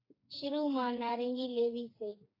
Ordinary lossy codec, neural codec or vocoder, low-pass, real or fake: AAC, 24 kbps; codec, 16 kHz, 4 kbps, FreqCodec, smaller model; 5.4 kHz; fake